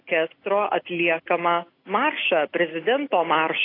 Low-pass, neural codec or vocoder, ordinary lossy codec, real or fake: 5.4 kHz; none; AAC, 32 kbps; real